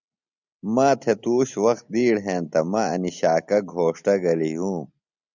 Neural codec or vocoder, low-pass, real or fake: none; 7.2 kHz; real